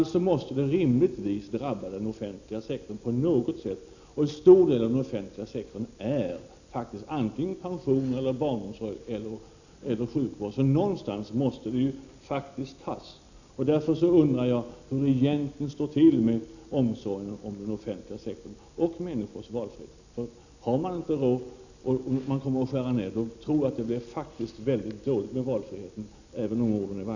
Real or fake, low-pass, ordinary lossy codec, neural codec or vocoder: real; 7.2 kHz; none; none